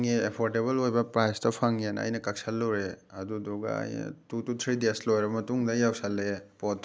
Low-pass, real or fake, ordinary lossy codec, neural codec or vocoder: none; real; none; none